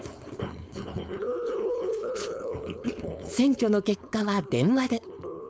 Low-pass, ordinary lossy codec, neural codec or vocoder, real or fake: none; none; codec, 16 kHz, 4.8 kbps, FACodec; fake